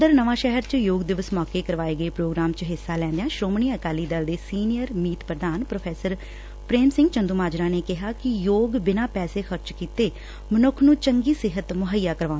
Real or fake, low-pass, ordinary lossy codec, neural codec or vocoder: real; none; none; none